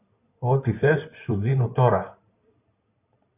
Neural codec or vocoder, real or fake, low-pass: codec, 16 kHz in and 24 kHz out, 2.2 kbps, FireRedTTS-2 codec; fake; 3.6 kHz